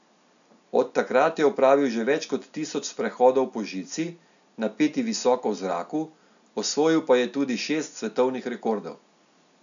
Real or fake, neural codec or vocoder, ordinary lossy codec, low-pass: real; none; none; 7.2 kHz